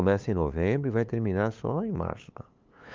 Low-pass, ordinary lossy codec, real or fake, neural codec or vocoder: 7.2 kHz; Opus, 24 kbps; fake; codec, 16 kHz, 8 kbps, FunCodec, trained on LibriTTS, 25 frames a second